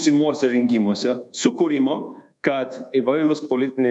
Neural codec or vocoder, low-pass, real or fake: codec, 24 kHz, 1.2 kbps, DualCodec; 10.8 kHz; fake